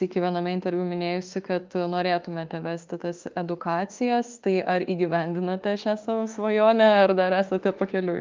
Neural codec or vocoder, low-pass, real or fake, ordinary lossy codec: autoencoder, 48 kHz, 32 numbers a frame, DAC-VAE, trained on Japanese speech; 7.2 kHz; fake; Opus, 32 kbps